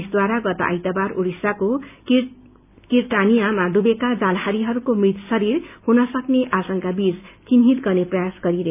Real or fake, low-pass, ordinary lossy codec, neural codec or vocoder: real; 3.6 kHz; none; none